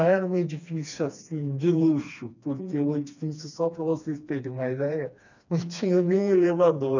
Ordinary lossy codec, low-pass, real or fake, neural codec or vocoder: none; 7.2 kHz; fake; codec, 16 kHz, 2 kbps, FreqCodec, smaller model